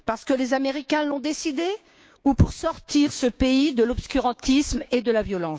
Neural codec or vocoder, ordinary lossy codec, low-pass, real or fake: codec, 16 kHz, 6 kbps, DAC; none; none; fake